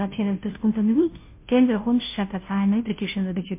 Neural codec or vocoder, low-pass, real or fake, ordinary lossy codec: codec, 16 kHz, 0.5 kbps, FunCodec, trained on Chinese and English, 25 frames a second; 3.6 kHz; fake; MP3, 24 kbps